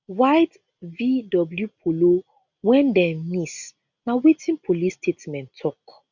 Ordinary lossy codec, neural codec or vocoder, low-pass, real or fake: none; none; 7.2 kHz; real